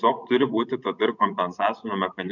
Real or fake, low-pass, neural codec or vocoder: fake; 7.2 kHz; vocoder, 24 kHz, 100 mel bands, Vocos